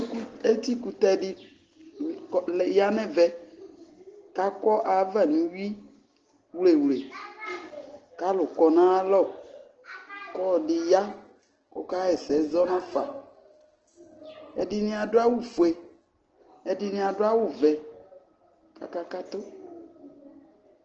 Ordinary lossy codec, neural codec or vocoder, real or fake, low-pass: Opus, 16 kbps; none; real; 7.2 kHz